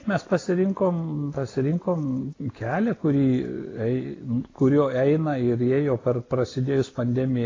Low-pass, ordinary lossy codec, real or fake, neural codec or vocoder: 7.2 kHz; AAC, 32 kbps; real; none